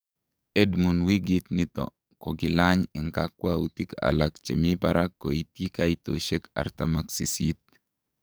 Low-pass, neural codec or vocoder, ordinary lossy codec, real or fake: none; codec, 44.1 kHz, 7.8 kbps, DAC; none; fake